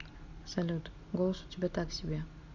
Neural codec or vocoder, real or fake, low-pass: none; real; 7.2 kHz